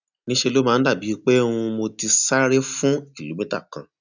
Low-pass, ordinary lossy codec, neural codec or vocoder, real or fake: 7.2 kHz; none; none; real